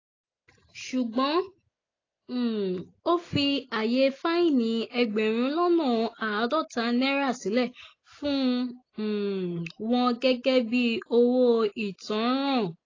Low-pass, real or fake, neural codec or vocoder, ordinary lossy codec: 7.2 kHz; real; none; AAC, 32 kbps